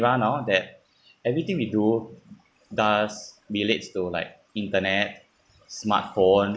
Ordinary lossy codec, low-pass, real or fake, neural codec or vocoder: none; none; real; none